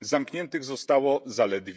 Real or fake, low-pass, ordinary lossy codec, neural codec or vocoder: fake; none; none; codec, 16 kHz, 16 kbps, FreqCodec, smaller model